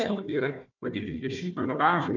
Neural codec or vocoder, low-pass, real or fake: codec, 16 kHz, 1 kbps, FunCodec, trained on Chinese and English, 50 frames a second; 7.2 kHz; fake